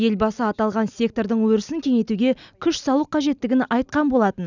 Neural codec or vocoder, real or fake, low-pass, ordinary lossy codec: none; real; 7.2 kHz; none